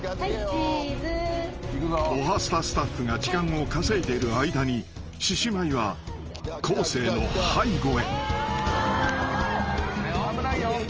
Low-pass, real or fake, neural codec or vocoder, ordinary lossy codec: 7.2 kHz; real; none; Opus, 24 kbps